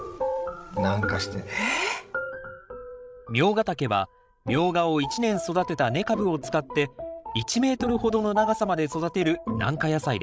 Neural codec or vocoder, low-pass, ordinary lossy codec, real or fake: codec, 16 kHz, 16 kbps, FreqCodec, larger model; none; none; fake